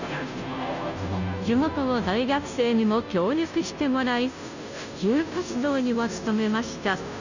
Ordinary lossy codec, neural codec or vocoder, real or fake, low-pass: none; codec, 16 kHz, 0.5 kbps, FunCodec, trained on Chinese and English, 25 frames a second; fake; 7.2 kHz